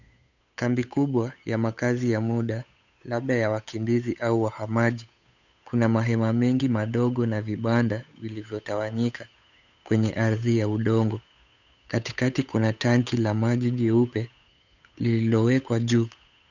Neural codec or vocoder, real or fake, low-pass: codec, 16 kHz, 8 kbps, FunCodec, trained on Chinese and English, 25 frames a second; fake; 7.2 kHz